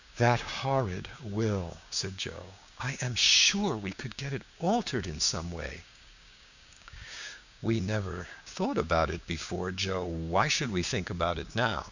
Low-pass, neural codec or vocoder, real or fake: 7.2 kHz; codec, 16 kHz, 6 kbps, DAC; fake